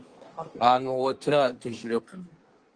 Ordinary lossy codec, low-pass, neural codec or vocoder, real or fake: Opus, 24 kbps; 9.9 kHz; codec, 24 kHz, 1 kbps, SNAC; fake